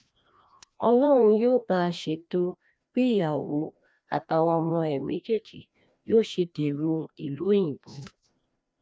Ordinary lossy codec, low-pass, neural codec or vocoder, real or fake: none; none; codec, 16 kHz, 1 kbps, FreqCodec, larger model; fake